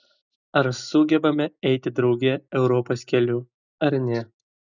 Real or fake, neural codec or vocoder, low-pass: real; none; 7.2 kHz